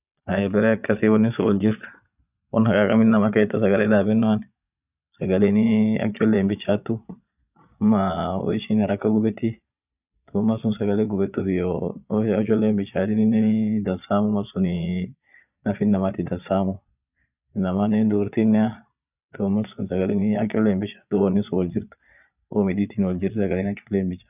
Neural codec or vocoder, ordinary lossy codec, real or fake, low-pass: vocoder, 22.05 kHz, 80 mel bands, Vocos; none; fake; 3.6 kHz